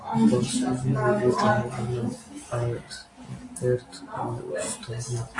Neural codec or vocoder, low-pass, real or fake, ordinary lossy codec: none; 10.8 kHz; real; AAC, 48 kbps